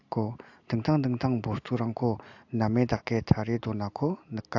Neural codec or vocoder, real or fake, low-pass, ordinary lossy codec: none; real; 7.2 kHz; AAC, 48 kbps